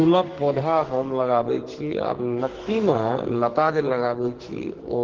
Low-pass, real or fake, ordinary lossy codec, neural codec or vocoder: 7.2 kHz; fake; Opus, 16 kbps; codec, 44.1 kHz, 2.6 kbps, SNAC